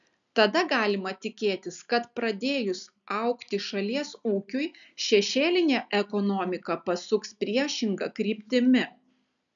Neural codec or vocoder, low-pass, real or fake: none; 7.2 kHz; real